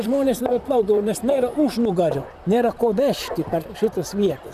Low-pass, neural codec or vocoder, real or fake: 14.4 kHz; vocoder, 44.1 kHz, 128 mel bands, Pupu-Vocoder; fake